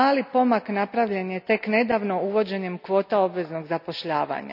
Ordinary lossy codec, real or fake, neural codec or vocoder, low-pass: none; real; none; 5.4 kHz